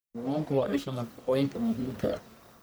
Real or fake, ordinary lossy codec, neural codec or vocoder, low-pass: fake; none; codec, 44.1 kHz, 1.7 kbps, Pupu-Codec; none